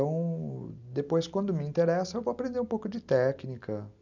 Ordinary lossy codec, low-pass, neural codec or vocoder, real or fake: none; 7.2 kHz; none; real